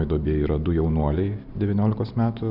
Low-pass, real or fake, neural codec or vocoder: 5.4 kHz; real; none